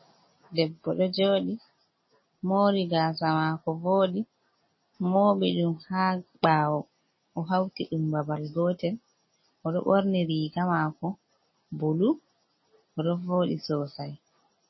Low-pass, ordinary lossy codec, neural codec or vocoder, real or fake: 7.2 kHz; MP3, 24 kbps; none; real